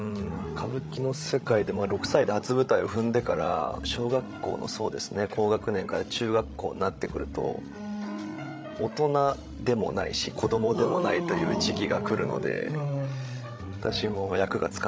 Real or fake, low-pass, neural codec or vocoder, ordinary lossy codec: fake; none; codec, 16 kHz, 16 kbps, FreqCodec, larger model; none